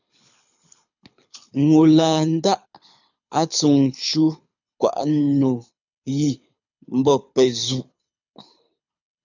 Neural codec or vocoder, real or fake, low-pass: codec, 24 kHz, 6 kbps, HILCodec; fake; 7.2 kHz